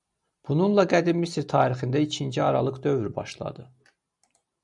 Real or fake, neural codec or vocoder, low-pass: real; none; 10.8 kHz